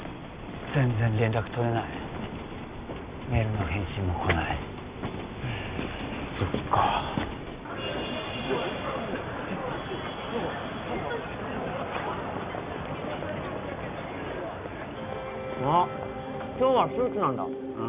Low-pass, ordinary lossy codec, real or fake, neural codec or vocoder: 3.6 kHz; Opus, 64 kbps; real; none